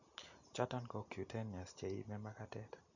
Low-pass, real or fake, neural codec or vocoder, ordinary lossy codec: 7.2 kHz; real; none; none